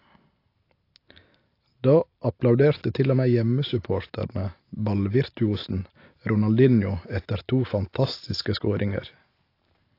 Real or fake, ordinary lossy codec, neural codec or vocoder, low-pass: real; AAC, 32 kbps; none; 5.4 kHz